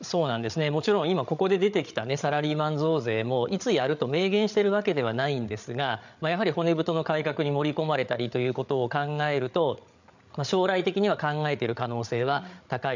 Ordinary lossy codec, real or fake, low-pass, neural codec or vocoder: none; fake; 7.2 kHz; codec, 16 kHz, 8 kbps, FreqCodec, larger model